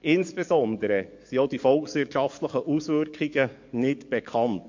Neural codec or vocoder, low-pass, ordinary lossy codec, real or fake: codec, 44.1 kHz, 7.8 kbps, DAC; 7.2 kHz; MP3, 48 kbps; fake